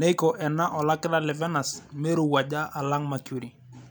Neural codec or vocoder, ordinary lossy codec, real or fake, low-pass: none; none; real; none